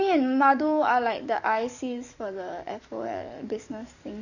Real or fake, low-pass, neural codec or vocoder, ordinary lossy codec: fake; 7.2 kHz; codec, 44.1 kHz, 7.8 kbps, DAC; none